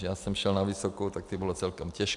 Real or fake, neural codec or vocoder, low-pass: real; none; 10.8 kHz